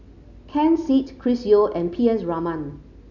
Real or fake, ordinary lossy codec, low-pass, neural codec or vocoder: real; none; 7.2 kHz; none